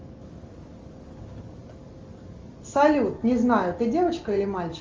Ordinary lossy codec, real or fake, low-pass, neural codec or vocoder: Opus, 32 kbps; real; 7.2 kHz; none